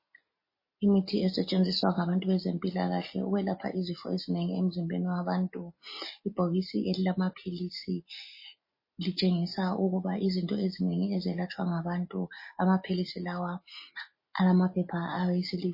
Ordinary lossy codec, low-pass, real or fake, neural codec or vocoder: MP3, 24 kbps; 5.4 kHz; real; none